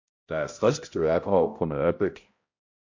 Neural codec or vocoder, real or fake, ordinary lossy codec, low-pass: codec, 16 kHz, 0.5 kbps, X-Codec, HuBERT features, trained on balanced general audio; fake; MP3, 48 kbps; 7.2 kHz